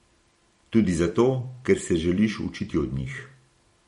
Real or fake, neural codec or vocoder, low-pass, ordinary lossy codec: fake; vocoder, 44.1 kHz, 128 mel bands every 256 samples, BigVGAN v2; 19.8 kHz; MP3, 48 kbps